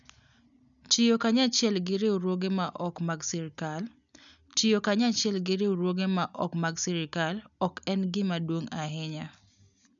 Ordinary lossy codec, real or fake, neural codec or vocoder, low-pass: none; real; none; 7.2 kHz